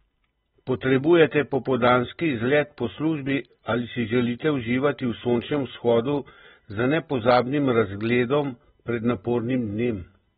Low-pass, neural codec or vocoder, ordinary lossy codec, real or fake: 10.8 kHz; none; AAC, 16 kbps; real